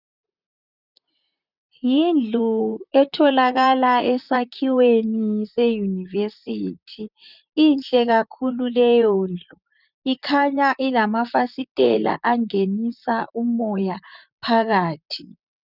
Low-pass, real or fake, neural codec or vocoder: 5.4 kHz; fake; vocoder, 44.1 kHz, 128 mel bands, Pupu-Vocoder